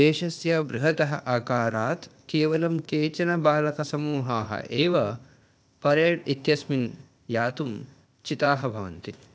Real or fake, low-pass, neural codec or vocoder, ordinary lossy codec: fake; none; codec, 16 kHz, 0.8 kbps, ZipCodec; none